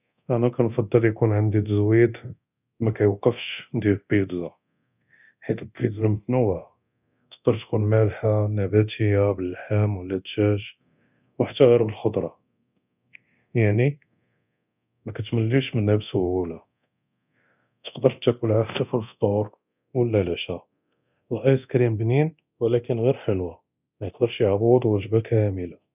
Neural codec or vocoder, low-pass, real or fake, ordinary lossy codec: codec, 24 kHz, 0.9 kbps, DualCodec; 3.6 kHz; fake; none